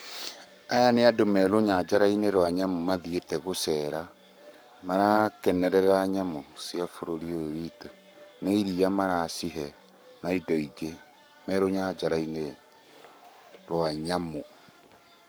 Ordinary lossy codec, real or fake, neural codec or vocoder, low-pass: none; fake; codec, 44.1 kHz, 7.8 kbps, Pupu-Codec; none